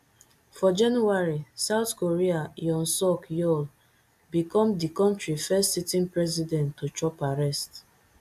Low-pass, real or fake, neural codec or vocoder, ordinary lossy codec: 14.4 kHz; real; none; AAC, 96 kbps